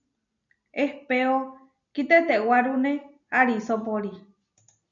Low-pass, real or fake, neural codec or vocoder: 7.2 kHz; real; none